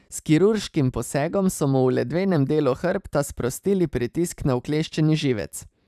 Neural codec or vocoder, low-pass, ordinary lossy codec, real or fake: vocoder, 44.1 kHz, 128 mel bands every 512 samples, BigVGAN v2; 14.4 kHz; none; fake